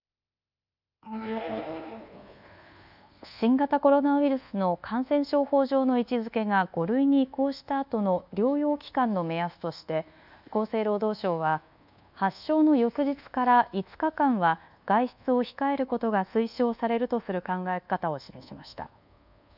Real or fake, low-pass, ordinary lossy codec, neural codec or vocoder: fake; 5.4 kHz; none; codec, 24 kHz, 1.2 kbps, DualCodec